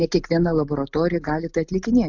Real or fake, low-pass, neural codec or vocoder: real; 7.2 kHz; none